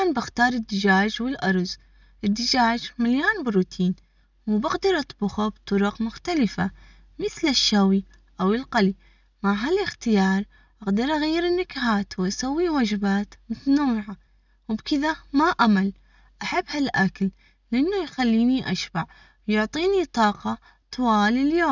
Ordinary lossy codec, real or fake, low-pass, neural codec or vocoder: none; real; 7.2 kHz; none